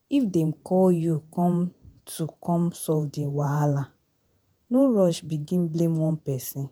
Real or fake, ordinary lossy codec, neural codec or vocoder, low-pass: fake; none; vocoder, 48 kHz, 128 mel bands, Vocos; none